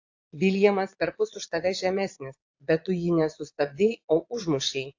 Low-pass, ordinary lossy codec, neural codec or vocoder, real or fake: 7.2 kHz; AAC, 48 kbps; vocoder, 22.05 kHz, 80 mel bands, Vocos; fake